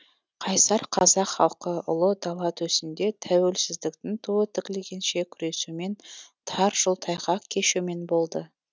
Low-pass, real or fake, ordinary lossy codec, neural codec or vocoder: none; real; none; none